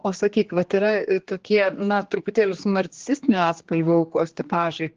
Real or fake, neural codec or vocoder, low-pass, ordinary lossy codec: fake; codec, 16 kHz, 2 kbps, X-Codec, HuBERT features, trained on general audio; 7.2 kHz; Opus, 16 kbps